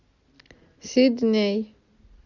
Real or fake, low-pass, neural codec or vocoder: real; 7.2 kHz; none